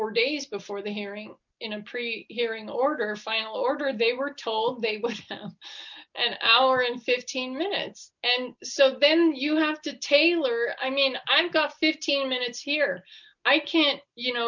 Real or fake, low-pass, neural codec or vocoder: real; 7.2 kHz; none